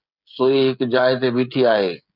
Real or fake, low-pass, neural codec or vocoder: fake; 5.4 kHz; codec, 16 kHz, 16 kbps, FreqCodec, smaller model